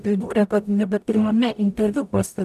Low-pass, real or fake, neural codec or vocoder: 14.4 kHz; fake; codec, 44.1 kHz, 0.9 kbps, DAC